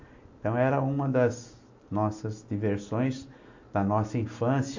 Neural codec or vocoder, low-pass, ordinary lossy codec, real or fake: none; 7.2 kHz; AAC, 48 kbps; real